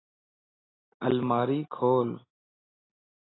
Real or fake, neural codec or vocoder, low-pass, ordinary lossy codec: real; none; 7.2 kHz; AAC, 16 kbps